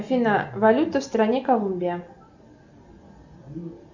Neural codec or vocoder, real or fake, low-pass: none; real; 7.2 kHz